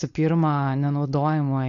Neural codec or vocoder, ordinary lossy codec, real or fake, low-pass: codec, 16 kHz, 4.8 kbps, FACodec; AAC, 48 kbps; fake; 7.2 kHz